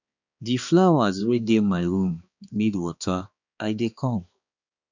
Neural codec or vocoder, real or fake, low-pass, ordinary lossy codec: codec, 16 kHz, 2 kbps, X-Codec, HuBERT features, trained on balanced general audio; fake; 7.2 kHz; none